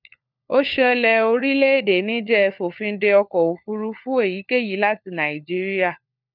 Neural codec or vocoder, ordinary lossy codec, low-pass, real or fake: codec, 16 kHz, 4 kbps, FunCodec, trained on LibriTTS, 50 frames a second; none; 5.4 kHz; fake